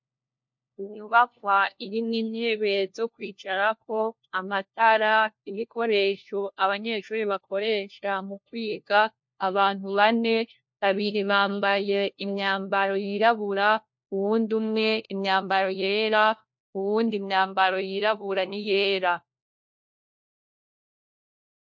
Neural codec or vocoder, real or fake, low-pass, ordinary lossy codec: codec, 16 kHz, 1 kbps, FunCodec, trained on LibriTTS, 50 frames a second; fake; 7.2 kHz; MP3, 48 kbps